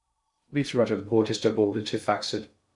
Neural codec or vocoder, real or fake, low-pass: codec, 16 kHz in and 24 kHz out, 0.6 kbps, FocalCodec, streaming, 2048 codes; fake; 10.8 kHz